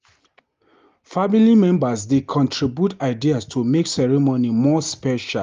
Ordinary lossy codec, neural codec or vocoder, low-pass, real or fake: Opus, 32 kbps; none; 7.2 kHz; real